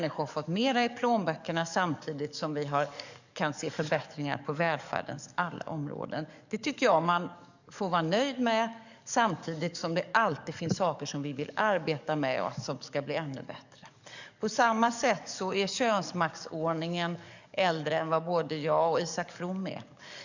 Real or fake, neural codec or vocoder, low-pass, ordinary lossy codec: fake; codec, 44.1 kHz, 7.8 kbps, DAC; 7.2 kHz; none